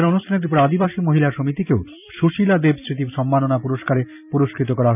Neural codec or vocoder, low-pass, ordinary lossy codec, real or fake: vocoder, 44.1 kHz, 128 mel bands every 256 samples, BigVGAN v2; 3.6 kHz; none; fake